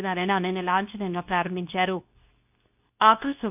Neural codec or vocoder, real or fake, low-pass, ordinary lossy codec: codec, 24 kHz, 0.9 kbps, WavTokenizer, medium speech release version 2; fake; 3.6 kHz; none